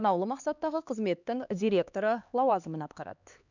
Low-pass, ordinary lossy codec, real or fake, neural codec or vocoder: 7.2 kHz; none; fake; codec, 16 kHz, 2 kbps, X-Codec, HuBERT features, trained on LibriSpeech